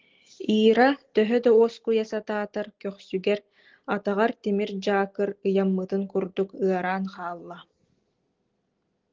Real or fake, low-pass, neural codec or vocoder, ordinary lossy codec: real; 7.2 kHz; none; Opus, 16 kbps